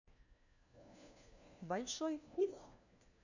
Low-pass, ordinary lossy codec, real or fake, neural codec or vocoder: 7.2 kHz; none; fake; codec, 16 kHz, 1 kbps, FunCodec, trained on LibriTTS, 50 frames a second